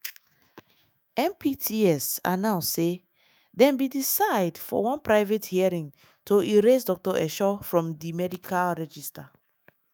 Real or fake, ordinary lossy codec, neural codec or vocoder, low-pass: fake; none; autoencoder, 48 kHz, 128 numbers a frame, DAC-VAE, trained on Japanese speech; none